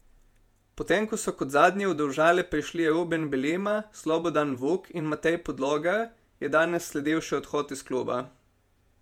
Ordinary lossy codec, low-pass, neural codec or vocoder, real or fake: MP3, 96 kbps; 19.8 kHz; vocoder, 48 kHz, 128 mel bands, Vocos; fake